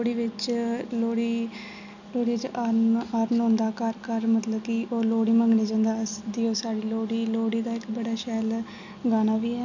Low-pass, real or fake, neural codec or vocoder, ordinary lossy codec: 7.2 kHz; real; none; none